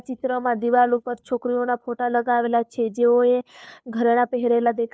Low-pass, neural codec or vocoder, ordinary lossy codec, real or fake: none; codec, 16 kHz, 2 kbps, FunCodec, trained on Chinese and English, 25 frames a second; none; fake